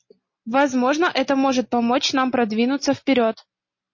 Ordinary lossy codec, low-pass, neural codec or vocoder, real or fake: MP3, 32 kbps; 7.2 kHz; none; real